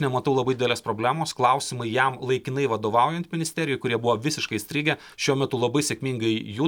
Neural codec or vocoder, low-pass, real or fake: none; 19.8 kHz; real